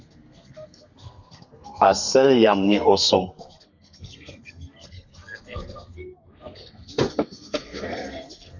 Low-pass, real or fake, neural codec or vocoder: 7.2 kHz; fake; codec, 32 kHz, 1.9 kbps, SNAC